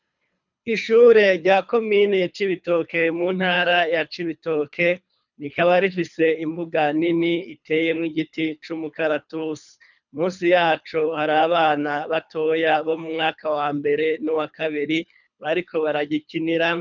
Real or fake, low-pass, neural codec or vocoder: fake; 7.2 kHz; codec, 24 kHz, 3 kbps, HILCodec